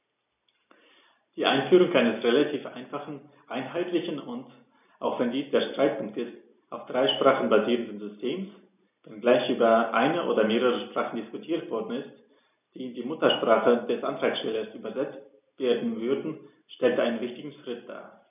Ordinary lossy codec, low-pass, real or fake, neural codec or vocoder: none; 3.6 kHz; real; none